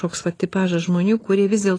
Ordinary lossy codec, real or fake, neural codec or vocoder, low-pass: AAC, 32 kbps; real; none; 9.9 kHz